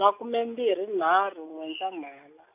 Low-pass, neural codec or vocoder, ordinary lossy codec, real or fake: 3.6 kHz; none; AAC, 32 kbps; real